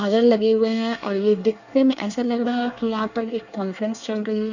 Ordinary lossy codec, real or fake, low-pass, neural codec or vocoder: none; fake; 7.2 kHz; codec, 24 kHz, 1 kbps, SNAC